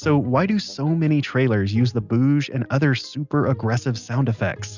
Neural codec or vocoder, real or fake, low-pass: none; real; 7.2 kHz